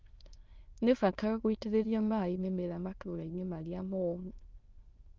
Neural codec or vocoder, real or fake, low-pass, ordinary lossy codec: autoencoder, 22.05 kHz, a latent of 192 numbers a frame, VITS, trained on many speakers; fake; 7.2 kHz; Opus, 32 kbps